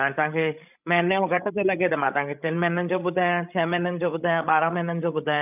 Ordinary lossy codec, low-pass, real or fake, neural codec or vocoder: none; 3.6 kHz; fake; codec, 16 kHz, 16 kbps, FreqCodec, larger model